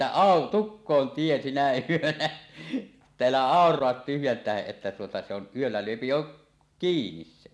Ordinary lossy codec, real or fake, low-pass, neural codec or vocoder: none; real; none; none